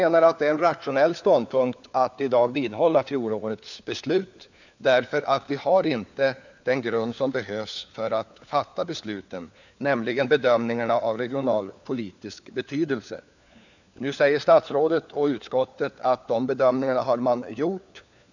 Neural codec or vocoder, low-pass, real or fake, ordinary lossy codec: codec, 16 kHz, 4 kbps, FunCodec, trained on LibriTTS, 50 frames a second; 7.2 kHz; fake; none